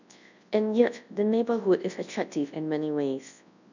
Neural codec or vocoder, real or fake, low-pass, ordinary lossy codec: codec, 24 kHz, 0.9 kbps, WavTokenizer, large speech release; fake; 7.2 kHz; none